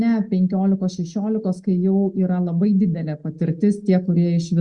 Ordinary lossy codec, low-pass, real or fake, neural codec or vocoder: Opus, 64 kbps; 10.8 kHz; real; none